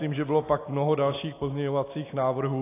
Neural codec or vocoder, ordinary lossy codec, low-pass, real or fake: none; AAC, 24 kbps; 3.6 kHz; real